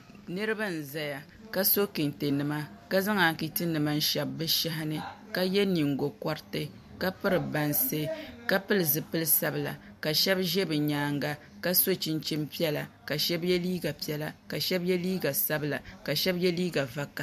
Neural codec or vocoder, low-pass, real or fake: none; 14.4 kHz; real